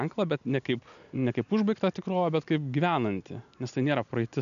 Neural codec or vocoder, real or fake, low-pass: none; real; 7.2 kHz